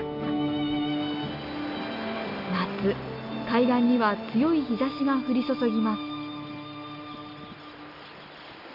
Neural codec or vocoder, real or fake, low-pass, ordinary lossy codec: none; real; 5.4 kHz; none